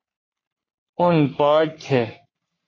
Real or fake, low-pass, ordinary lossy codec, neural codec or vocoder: fake; 7.2 kHz; AAC, 32 kbps; codec, 44.1 kHz, 3.4 kbps, Pupu-Codec